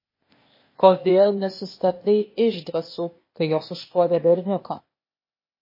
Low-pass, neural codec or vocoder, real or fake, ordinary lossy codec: 5.4 kHz; codec, 16 kHz, 0.8 kbps, ZipCodec; fake; MP3, 24 kbps